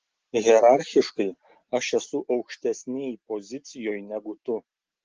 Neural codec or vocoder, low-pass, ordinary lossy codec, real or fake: none; 7.2 kHz; Opus, 16 kbps; real